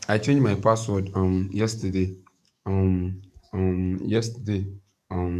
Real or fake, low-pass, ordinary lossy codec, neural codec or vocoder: fake; 14.4 kHz; none; codec, 44.1 kHz, 7.8 kbps, DAC